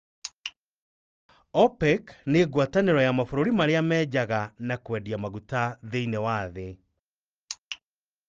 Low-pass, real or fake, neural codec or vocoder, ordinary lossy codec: 7.2 kHz; real; none; Opus, 24 kbps